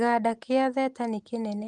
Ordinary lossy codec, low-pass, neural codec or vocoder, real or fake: Opus, 24 kbps; 10.8 kHz; none; real